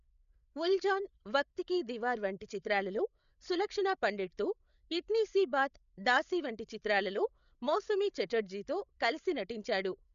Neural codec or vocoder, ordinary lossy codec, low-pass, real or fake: codec, 16 kHz, 8 kbps, FreqCodec, larger model; none; 7.2 kHz; fake